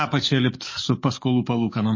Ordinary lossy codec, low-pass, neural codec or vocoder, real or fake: MP3, 32 kbps; 7.2 kHz; codec, 44.1 kHz, 7.8 kbps, DAC; fake